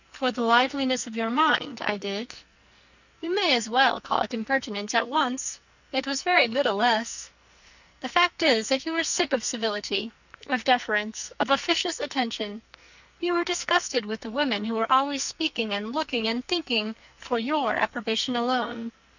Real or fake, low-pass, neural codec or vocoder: fake; 7.2 kHz; codec, 44.1 kHz, 2.6 kbps, SNAC